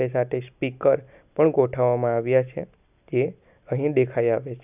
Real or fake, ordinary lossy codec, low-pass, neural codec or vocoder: real; none; 3.6 kHz; none